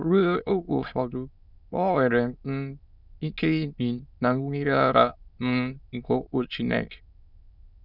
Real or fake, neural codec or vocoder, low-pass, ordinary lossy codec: fake; autoencoder, 22.05 kHz, a latent of 192 numbers a frame, VITS, trained on many speakers; 5.4 kHz; none